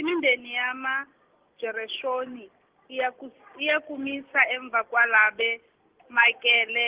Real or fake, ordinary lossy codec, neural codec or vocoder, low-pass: real; Opus, 16 kbps; none; 3.6 kHz